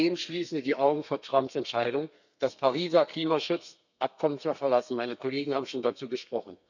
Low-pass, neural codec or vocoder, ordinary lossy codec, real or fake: 7.2 kHz; codec, 32 kHz, 1.9 kbps, SNAC; none; fake